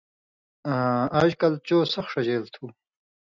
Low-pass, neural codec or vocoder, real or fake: 7.2 kHz; none; real